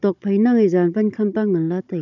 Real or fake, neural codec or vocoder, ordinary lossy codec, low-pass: fake; codec, 16 kHz, 16 kbps, FunCodec, trained on Chinese and English, 50 frames a second; none; 7.2 kHz